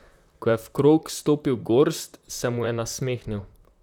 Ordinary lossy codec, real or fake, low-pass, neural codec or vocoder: none; fake; 19.8 kHz; vocoder, 44.1 kHz, 128 mel bands, Pupu-Vocoder